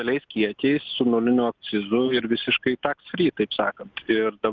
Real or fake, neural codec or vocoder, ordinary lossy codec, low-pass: real; none; Opus, 24 kbps; 7.2 kHz